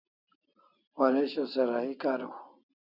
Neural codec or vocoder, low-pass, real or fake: none; 5.4 kHz; real